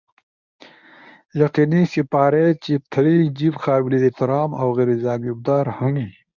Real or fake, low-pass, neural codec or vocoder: fake; 7.2 kHz; codec, 24 kHz, 0.9 kbps, WavTokenizer, medium speech release version 1